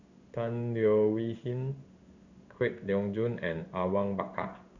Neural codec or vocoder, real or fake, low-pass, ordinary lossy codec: codec, 16 kHz in and 24 kHz out, 1 kbps, XY-Tokenizer; fake; 7.2 kHz; Opus, 64 kbps